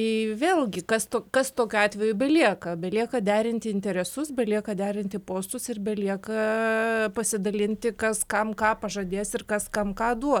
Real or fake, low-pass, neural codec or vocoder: real; 19.8 kHz; none